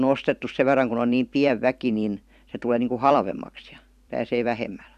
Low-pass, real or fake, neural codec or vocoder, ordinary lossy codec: 14.4 kHz; fake; vocoder, 44.1 kHz, 128 mel bands every 256 samples, BigVGAN v2; none